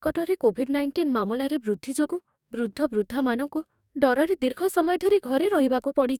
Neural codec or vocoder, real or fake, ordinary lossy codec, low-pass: codec, 44.1 kHz, 2.6 kbps, DAC; fake; none; 19.8 kHz